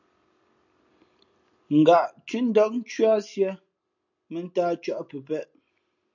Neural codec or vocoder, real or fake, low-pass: none; real; 7.2 kHz